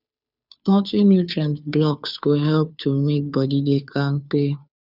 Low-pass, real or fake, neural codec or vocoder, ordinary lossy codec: 5.4 kHz; fake; codec, 16 kHz, 2 kbps, FunCodec, trained on Chinese and English, 25 frames a second; none